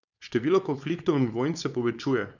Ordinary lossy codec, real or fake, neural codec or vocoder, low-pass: none; fake; codec, 16 kHz, 4.8 kbps, FACodec; 7.2 kHz